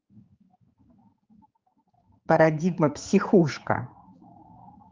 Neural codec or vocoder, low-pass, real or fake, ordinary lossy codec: codec, 16 kHz, 4 kbps, X-Codec, HuBERT features, trained on general audio; 7.2 kHz; fake; Opus, 24 kbps